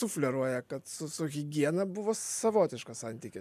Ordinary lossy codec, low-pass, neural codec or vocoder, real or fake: MP3, 96 kbps; 14.4 kHz; none; real